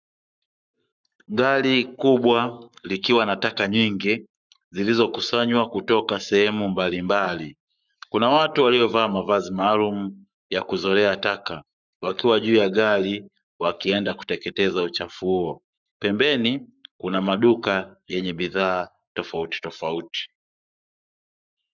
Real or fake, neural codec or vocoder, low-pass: fake; codec, 44.1 kHz, 7.8 kbps, Pupu-Codec; 7.2 kHz